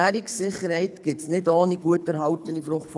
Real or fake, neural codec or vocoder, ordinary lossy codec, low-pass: fake; codec, 24 kHz, 3 kbps, HILCodec; none; none